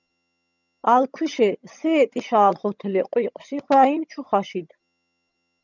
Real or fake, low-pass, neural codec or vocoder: fake; 7.2 kHz; vocoder, 22.05 kHz, 80 mel bands, HiFi-GAN